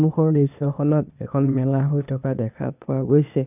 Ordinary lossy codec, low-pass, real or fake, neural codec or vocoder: none; 3.6 kHz; fake; codec, 16 kHz, 0.8 kbps, ZipCodec